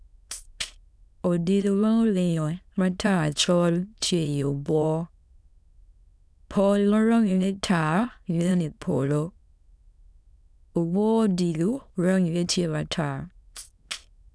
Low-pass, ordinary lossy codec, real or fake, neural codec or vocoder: none; none; fake; autoencoder, 22.05 kHz, a latent of 192 numbers a frame, VITS, trained on many speakers